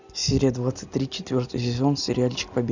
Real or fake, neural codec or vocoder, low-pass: real; none; 7.2 kHz